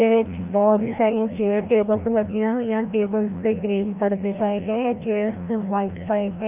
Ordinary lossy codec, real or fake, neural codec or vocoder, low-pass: none; fake; codec, 16 kHz, 1 kbps, FreqCodec, larger model; 3.6 kHz